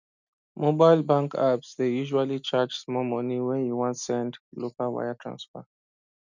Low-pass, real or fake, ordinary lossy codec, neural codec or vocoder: 7.2 kHz; real; none; none